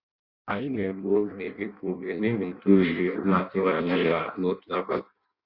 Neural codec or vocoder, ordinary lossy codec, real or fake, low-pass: codec, 16 kHz in and 24 kHz out, 0.6 kbps, FireRedTTS-2 codec; Opus, 64 kbps; fake; 5.4 kHz